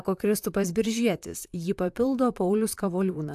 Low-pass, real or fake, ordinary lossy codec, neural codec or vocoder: 14.4 kHz; fake; AAC, 96 kbps; vocoder, 44.1 kHz, 128 mel bands, Pupu-Vocoder